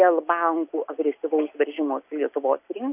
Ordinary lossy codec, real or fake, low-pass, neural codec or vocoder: AAC, 32 kbps; real; 3.6 kHz; none